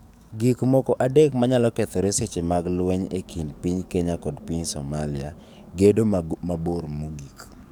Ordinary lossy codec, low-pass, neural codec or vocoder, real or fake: none; none; codec, 44.1 kHz, 7.8 kbps, DAC; fake